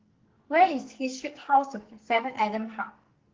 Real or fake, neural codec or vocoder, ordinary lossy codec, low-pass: fake; codec, 44.1 kHz, 2.6 kbps, SNAC; Opus, 16 kbps; 7.2 kHz